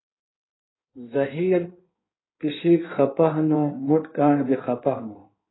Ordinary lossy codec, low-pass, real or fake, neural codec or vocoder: AAC, 16 kbps; 7.2 kHz; fake; codec, 16 kHz in and 24 kHz out, 1.1 kbps, FireRedTTS-2 codec